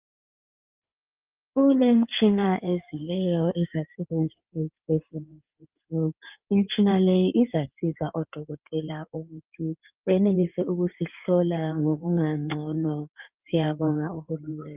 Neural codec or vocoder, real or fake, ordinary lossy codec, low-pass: codec, 16 kHz in and 24 kHz out, 2.2 kbps, FireRedTTS-2 codec; fake; Opus, 24 kbps; 3.6 kHz